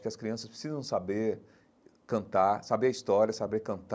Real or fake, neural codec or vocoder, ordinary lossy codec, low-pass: real; none; none; none